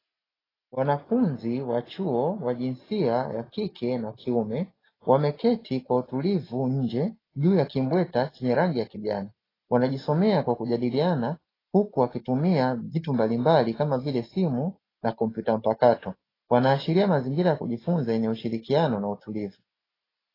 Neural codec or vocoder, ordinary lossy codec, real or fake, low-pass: none; AAC, 24 kbps; real; 5.4 kHz